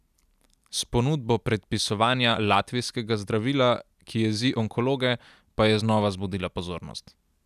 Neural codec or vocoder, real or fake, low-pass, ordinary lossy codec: none; real; 14.4 kHz; none